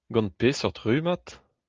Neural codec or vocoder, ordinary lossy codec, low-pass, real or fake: none; Opus, 24 kbps; 7.2 kHz; real